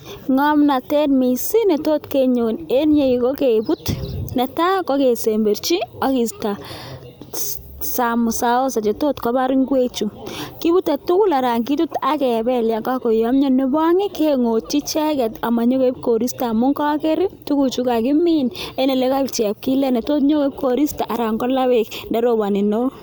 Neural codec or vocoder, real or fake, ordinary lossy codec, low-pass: none; real; none; none